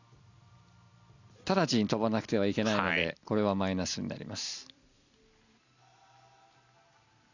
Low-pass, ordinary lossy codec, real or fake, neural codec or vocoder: 7.2 kHz; none; real; none